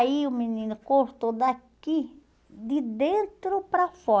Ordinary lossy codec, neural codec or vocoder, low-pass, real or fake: none; none; none; real